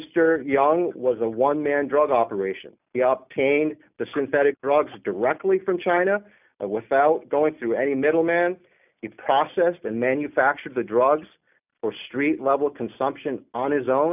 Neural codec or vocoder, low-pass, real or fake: none; 3.6 kHz; real